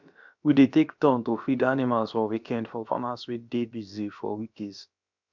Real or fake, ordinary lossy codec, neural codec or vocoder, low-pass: fake; none; codec, 16 kHz, about 1 kbps, DyCAST, with the encoder's durations; 7.2 kHz